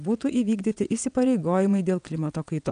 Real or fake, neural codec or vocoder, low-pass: fake; vocoder, 22.05 kHz, 80 mel bands, WaveNeXt; 9.9 kHz